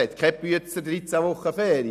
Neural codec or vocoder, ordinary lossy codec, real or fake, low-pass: none; none; real; 14.4 kHz